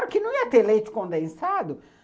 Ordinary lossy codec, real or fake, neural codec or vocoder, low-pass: none; real; none; none